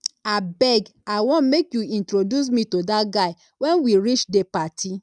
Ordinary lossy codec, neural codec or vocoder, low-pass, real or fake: none; none; 9.9 kHz; real